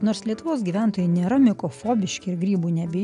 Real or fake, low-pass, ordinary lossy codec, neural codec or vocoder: real; 10.8 kHz; MP3, 96 kbps; none